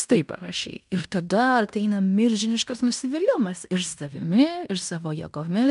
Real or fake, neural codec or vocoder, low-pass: fake; codec, 16 kHz in and 24 kHz out, 0.9 kbps, LongCat-Audio-Codec, fine tuned four codebook decoder; 10.8 kHz